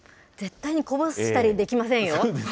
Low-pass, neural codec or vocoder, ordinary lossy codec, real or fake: none; none; none; real